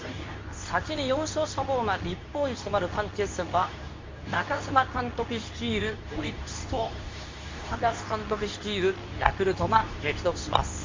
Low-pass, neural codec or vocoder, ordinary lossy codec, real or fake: 7.2 kHz; codec, 24 kHz, 0.9 kbps, WavTokenizer, medium speech release version 2; MP3, 48 kbps; fake